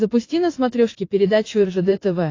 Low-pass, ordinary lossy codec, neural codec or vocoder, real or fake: 7.2 kHz; AAC, 32 kbps; vocoder, 44.1 kHz, 80 mel bands, Vocos; fake